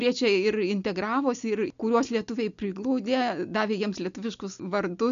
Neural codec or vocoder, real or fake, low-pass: none; real; 7.2 kHz